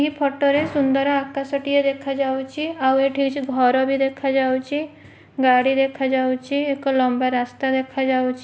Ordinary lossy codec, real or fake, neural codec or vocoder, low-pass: none; real; none; none